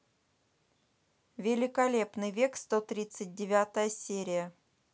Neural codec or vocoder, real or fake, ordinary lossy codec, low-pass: none; real; none; none